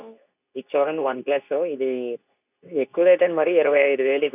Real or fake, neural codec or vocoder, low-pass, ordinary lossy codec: fake; codec, 24 kHz, 0.9 kbps, WavTokenizer, medium speech release version 2; 3.6 kHz; none